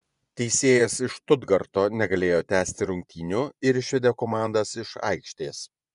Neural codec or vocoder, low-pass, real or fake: vocoder, 24 kHz, 100 mel bands, Vocos; 10.8 kHz; fake